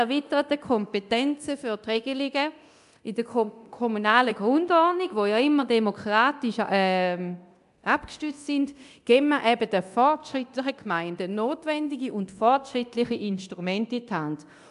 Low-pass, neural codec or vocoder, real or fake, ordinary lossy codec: 10.8 kHz; codec, 24 kHz, 0.9 kbps, DualCodec; fake; AAC, 96 kbps